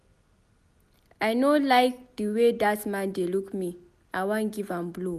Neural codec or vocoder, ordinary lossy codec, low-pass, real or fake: none; none; 14.4 kHz; real